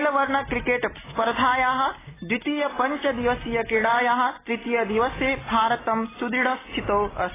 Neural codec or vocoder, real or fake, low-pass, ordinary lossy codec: none; real; 3.6 kHz; AAC, 16 kbps